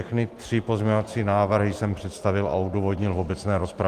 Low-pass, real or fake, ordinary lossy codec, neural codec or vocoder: 14.4 kHz; real; Opus, 24 kbps; none